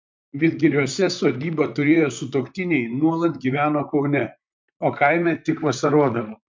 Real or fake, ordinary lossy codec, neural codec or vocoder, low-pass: fake; MP3, 64 kbps; vocoder, 44.1 kHz, 128 mel bands, Pupu-Vocoder; 7.2 kHz